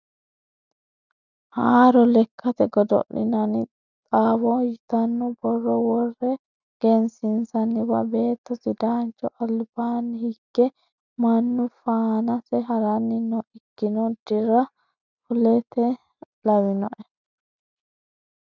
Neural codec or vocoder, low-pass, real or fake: none; 7.2 kHz; real